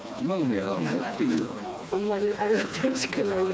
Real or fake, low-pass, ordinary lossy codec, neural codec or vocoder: fake; none; none; codec, 16 kHz, 2 kbps, FreqCodec, smaller model